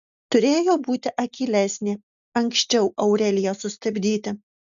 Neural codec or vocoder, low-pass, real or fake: none; 7.2 kHz; real